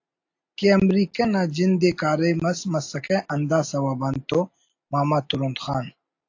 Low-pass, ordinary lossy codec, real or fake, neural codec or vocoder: 7.2 kHz; AAC, 48 kbps; real; none